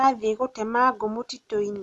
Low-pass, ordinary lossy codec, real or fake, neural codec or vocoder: none; none; real; none